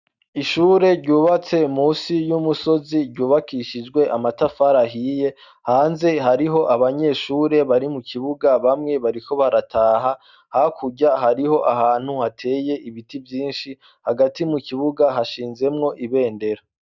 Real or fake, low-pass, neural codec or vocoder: real; 7.2 kHz; none